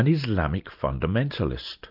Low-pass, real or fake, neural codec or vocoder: 5.4 kHz; real; none